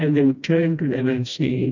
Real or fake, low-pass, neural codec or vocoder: fake; 7.2 kHz; codec, 16 kHz, 1 kbps, FreqCodec, smaller model